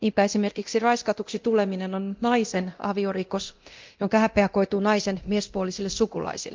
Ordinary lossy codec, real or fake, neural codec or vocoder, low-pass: Opus, 24 kbps; fake; codec, 24 kHz, 0.9 kbps, DualCodec; 7.2 kHz